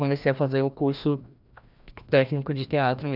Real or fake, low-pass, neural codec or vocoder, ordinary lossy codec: fake; 5.4 kHz; codec, 16 kHz, 1 kbps, FreqCodec, larger model; none